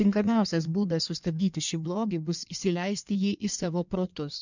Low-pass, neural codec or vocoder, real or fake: 7.2 kHz; codec, 16 kHz in and 24 kHz out, 1.1 kbps, FireRedTTS-2 codec; fake